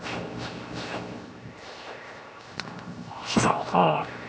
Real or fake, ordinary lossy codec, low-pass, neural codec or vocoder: fake; none; none; codec, 16 kHz, 0.7 kbps, FocalCodec